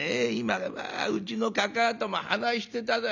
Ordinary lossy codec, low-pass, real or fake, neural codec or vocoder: none; 7.2 kHz; real; none